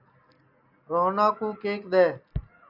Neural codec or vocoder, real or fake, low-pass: none; real; 5.4 kHz